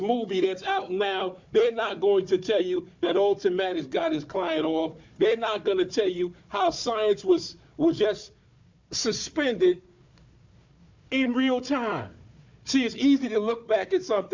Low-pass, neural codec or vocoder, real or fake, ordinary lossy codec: 7.2 kHz; codec, 16 kHz, 4 kbps, FunCodec, trained on Chinese and English, 50 frames a second; fake; MP3, 64 kbps